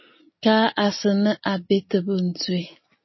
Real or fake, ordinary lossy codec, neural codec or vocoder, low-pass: real; MP3, 24 kbps; none; 7.2 kHz